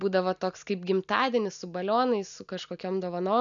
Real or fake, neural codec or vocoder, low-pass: real; none; 7.2 kHz